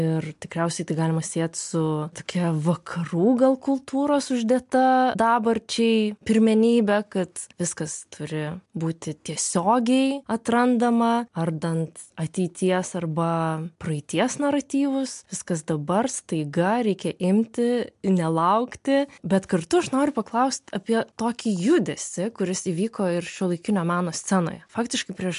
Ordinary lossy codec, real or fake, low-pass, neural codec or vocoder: MP3, 64 kbps; real; 10.8 kHz; none